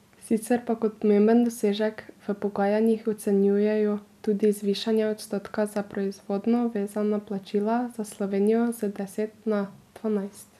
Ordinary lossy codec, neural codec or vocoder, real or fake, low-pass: none; none; real; 14.4 kHz